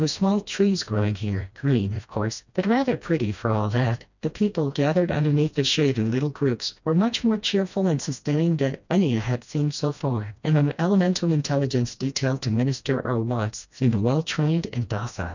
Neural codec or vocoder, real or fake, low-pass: codec, 16 kHz, 1 kbps, FreqCodec, smaller model; fake; 7.2 kHz